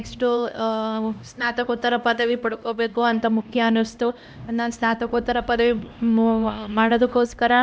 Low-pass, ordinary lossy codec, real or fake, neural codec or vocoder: none; none; fake; codec, 16 kHz, 1 kbps, X-Codec, HuBERT features, trained on LibriSpeech